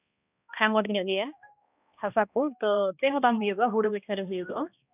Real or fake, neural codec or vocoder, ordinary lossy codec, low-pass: fake; codec, 16 kHz, 1 kbps, X-Codec, HuBERT features, trained on balanced general audio; none; 3.6 kHz